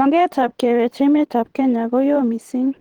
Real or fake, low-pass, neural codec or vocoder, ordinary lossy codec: fake; 19.8 kHz; vocoder, 44.1 kHz, 128 mel bands every 512 samples, BigVGAN v2; Opus, 16 kbps